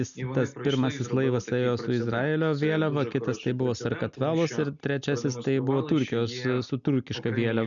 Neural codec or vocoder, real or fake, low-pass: none; real; 7.2 kHz